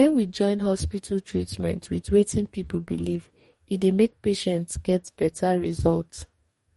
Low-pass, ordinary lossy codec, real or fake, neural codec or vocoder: 19.8 kHz; MP3, 48 kbps; fake; codec, 44.1 kHz, 2.6 kbps, DAC